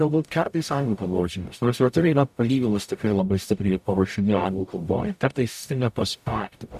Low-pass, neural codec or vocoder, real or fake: 14.4 kHz; codec, 44.1 kHz, 0.9 kbps, DAC; fake